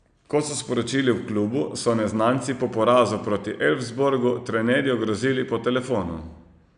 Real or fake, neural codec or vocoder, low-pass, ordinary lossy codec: real; none; 9.9 kHz; none